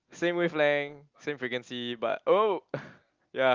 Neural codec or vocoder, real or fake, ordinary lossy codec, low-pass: none; real; Opus, 32 kbps; 7.2 kHz